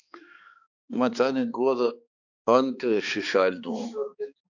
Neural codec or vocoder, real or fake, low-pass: codec, 16 kHz, 2 kbps, X-Codec, HuBERT features, trained on balanced general audio; fake; 7.2 kHz